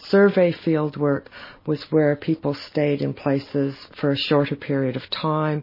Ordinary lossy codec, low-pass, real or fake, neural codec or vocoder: MP3, 24 kbps; 5.4 kHz; real; none